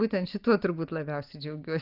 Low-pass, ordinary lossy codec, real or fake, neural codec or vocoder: 5.4 kHz; Opus, 24 kbps; real; none